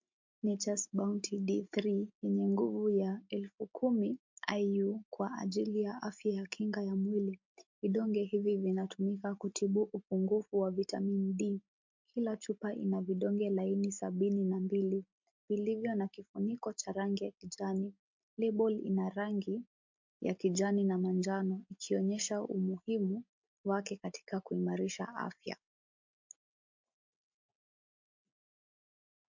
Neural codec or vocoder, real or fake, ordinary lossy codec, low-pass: none; real; MP3, 48 kbps; 7.2 kHz